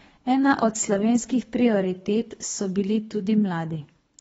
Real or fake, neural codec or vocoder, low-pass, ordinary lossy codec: fake; codec, 24 kHz, 3 kbps, HILCodec; 10.8 kHz; AAC, 24 kbps